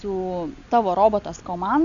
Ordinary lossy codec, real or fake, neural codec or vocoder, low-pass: Opus, 64 kbps; real; none; 7.2 kHz